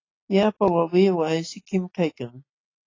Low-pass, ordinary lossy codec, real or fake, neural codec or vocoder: 7.2 kHz; AAC, 32 kbps; real; none